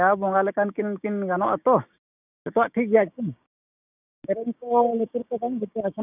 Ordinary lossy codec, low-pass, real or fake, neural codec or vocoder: none; 3.6 kHz; fake; codec, 16 kHz, 6 kbps, DAC